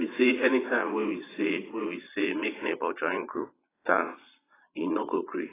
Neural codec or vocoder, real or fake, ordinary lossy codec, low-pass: vocoder, 22.05 kHz, 80 mel bands, Vocos; fake; AAC, 16 kbps; 3.6 kHz